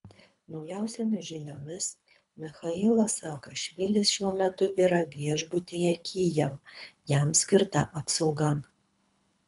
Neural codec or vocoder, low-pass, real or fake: codec, 24 kHz, 3 kbps, HILCodec; 10.8 kHz; fake